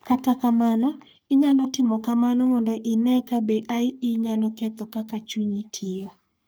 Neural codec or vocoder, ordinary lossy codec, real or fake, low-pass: codec, 44.1 kHz, 3.4 kbps, Pupu-Codec; none; fake; none